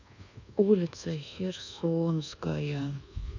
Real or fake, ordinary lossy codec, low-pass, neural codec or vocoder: fake; none; 7.2 kHz; codec, 24 kHz, 1.2 kbps, DualCodec